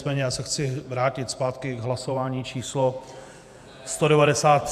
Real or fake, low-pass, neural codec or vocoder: fake; 14.4 kHz; vocoder, 48 kHz, 128 mel bands, Vocos